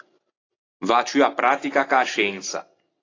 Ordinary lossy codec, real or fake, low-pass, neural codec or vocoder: AAC, 32 kbps; real; 7.2 kHz; none